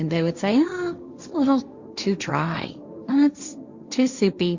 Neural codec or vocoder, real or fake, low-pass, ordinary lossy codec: codec, 16 kHz, 1.1 kbps, Voila-Tokenizer; fake; 7.2 kHz; Opus, 64 kbps